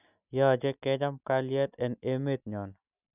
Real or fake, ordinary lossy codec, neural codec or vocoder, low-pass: real; none; none; 3.6 kHz